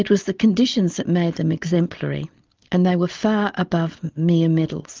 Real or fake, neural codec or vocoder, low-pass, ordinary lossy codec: real; none; 7.2 kHz; Opus, 24 kbps